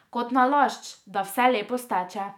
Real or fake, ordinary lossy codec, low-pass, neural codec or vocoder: fake; none; 19.8 kHz; autoencoder, 48 kHz, 128 numbers a frame, DAC-VAE, trained on Japanese speech